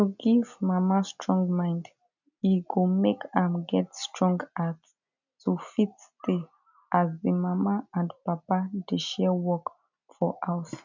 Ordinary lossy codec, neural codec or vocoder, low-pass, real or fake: none; none; 7.2 kHz; real